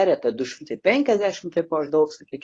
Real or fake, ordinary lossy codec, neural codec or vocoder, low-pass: fake; AAC, 32 kbps; codec, 24 kHz, 0.9 kbps, WavTokenizer, medium speech release version 1; 10.8 kHz